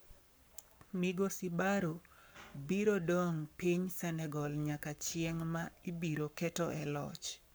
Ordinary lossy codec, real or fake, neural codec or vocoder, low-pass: none; fake; codec, 44.1 kHz, 7.8 kbps, Pupu-Codec; none